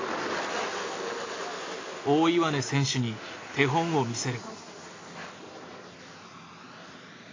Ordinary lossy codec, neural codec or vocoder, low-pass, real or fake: AAC, 32 kbps; none; 7.2 kHz; real